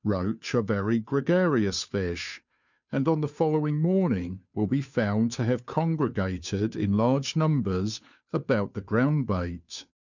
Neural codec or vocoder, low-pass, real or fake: codec, 16 kHz, 2 kbps, FunCodec, trained on Chinese and English, 25 frames a second; 7.2 kHz; fake